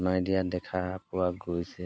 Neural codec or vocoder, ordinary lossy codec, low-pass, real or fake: none; none; none; real